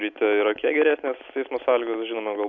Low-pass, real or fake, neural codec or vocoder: 7.2 kHz; real; none